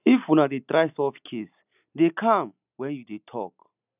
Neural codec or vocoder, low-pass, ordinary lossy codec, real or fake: none; 3.6 kHz; none; real